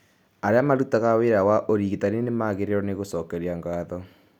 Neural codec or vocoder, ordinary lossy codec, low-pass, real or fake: none; none; 19.8 kHz; real